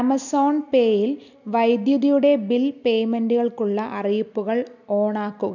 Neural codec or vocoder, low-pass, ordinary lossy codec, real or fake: none; 7.2 kHz; none; real